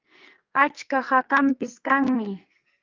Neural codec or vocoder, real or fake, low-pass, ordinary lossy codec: codec, 44.1 kHz, 2.6 kbps, SNAC; fake; 7.2 kHz; Opus, 24 kbps